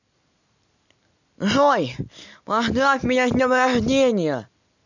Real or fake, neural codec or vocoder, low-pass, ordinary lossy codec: real; none; 7.2 kHz; none